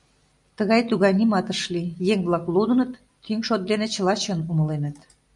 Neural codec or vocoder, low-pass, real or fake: none; 10.8 kHz; real